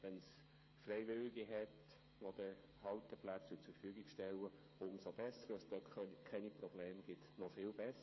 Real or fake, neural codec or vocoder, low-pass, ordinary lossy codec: fake; codec, 16 kHz, 8 kbps, FreqCodec, smaller model; 7.2 kHz; MP3, 24 kbps